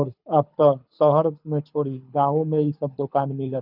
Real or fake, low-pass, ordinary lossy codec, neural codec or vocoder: fake; 5.4 kHz; none; codec, 24 kHz, 6 kbps, HILCodec